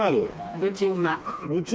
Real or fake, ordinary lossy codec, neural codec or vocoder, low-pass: fake; none; codec, 16 kHz, 2 kbps, FreqCodec, smaller model; none